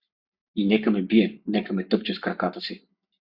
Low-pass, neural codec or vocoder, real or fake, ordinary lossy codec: 5.4 kHz; codec, 44.1 kHz, 7.8 kbps, Pupu-Codec; fake; Opus, 64 kbps